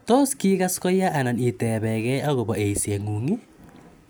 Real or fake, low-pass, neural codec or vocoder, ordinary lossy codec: real; none; none; none